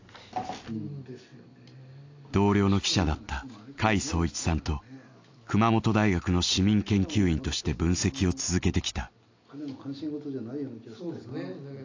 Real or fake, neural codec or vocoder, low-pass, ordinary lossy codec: real; none; 7.2 kHz; AAC, 48 kbps